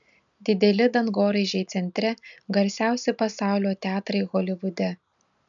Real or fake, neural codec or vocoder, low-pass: real; none; 7.2 kHz